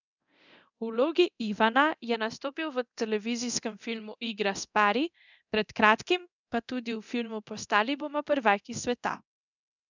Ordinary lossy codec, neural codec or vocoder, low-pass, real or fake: none; codec, 24 kHz, 0.9 kbps, DualCodec; 7.2 kHz; fake